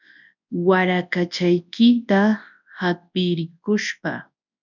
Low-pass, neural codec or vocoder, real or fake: 7.2 kHz; codec, 24 kHz, 0.9 kbps, WavTokenizer, large speech release; fake